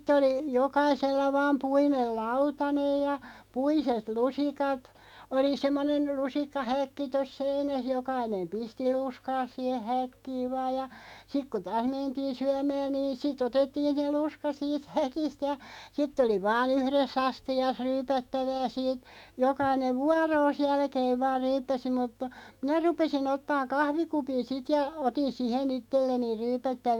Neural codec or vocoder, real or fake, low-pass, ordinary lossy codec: autoencoder, 48 kHz, 128 numbers a frame, DAC-VAE, trained on Japanese speech; fake; 19.8 kHz; none